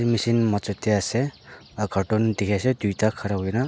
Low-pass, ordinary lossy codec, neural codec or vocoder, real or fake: none; none; none; real